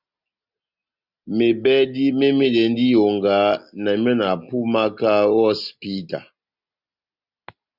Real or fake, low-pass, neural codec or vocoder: real; 5.4 kHz; none